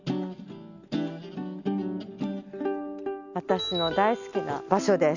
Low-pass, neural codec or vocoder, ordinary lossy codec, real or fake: 7.2 kHz; none; none; real